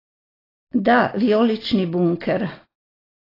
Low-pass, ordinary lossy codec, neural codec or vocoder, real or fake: 5.4 kHz; AAC, 24 kbps; none; real